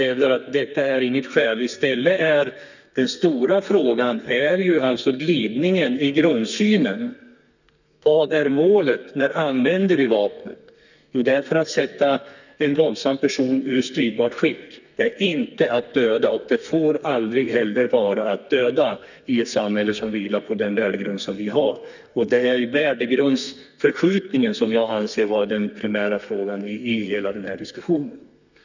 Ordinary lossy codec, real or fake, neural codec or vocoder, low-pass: none; fake; codec, 32 kHz, 1.9 kbps, SNAC; 7.2 kHz